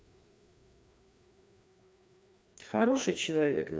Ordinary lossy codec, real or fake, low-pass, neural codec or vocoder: none; fake; none; codec, 16 kHz, 2 kbps, FreqCodec, larger model